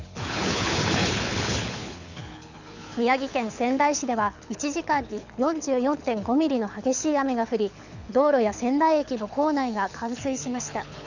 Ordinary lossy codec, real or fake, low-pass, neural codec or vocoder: none; fake; 7.2 kHz; codec, 24 kHz, 6 kbps, HILCodec